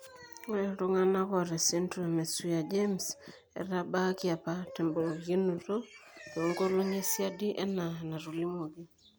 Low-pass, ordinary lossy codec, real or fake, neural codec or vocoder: none; none; real; none